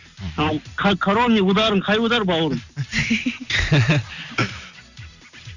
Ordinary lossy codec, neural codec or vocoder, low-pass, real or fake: none; none; 7.2 kHz; real